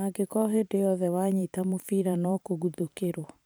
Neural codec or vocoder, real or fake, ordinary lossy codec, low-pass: vocoder, 44.1 kHz, 128 mel bands every 512 samples, BigVGAN v2; fake; none; none